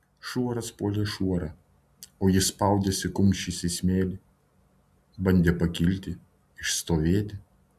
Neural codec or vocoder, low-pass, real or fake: none; 14.4 kHz; real